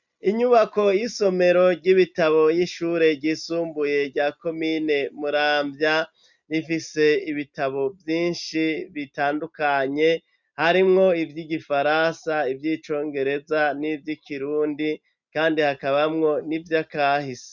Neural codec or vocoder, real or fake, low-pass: none; real; 7.2 kHz